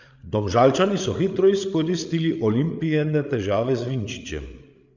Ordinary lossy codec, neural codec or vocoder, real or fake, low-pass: none; codec, 16 kHz, 8 kbps, FreqCodec, larger model; fake; 7.2 kHz